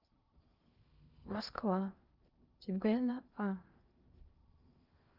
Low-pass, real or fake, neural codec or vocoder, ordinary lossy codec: 5.4 kHz; fake; codec, 16 kHz in and 24 kHz out, 0.6 kbps, FocalCodec, streaming, 2048 codes; Opus, 24 kbps